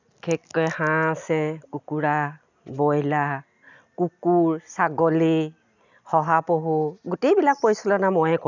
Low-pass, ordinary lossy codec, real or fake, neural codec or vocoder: 7.2 kHz; none; real; none